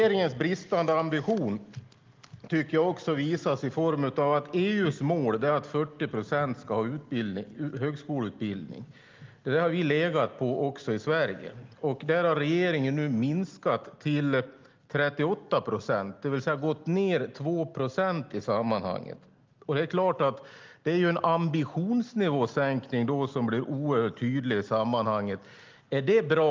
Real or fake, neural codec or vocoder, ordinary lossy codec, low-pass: real; none; Opus, 32 kbps; 7.2 kHz